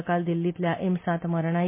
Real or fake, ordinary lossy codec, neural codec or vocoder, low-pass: real; MP3, 24 kbps; none; 3.6 kHz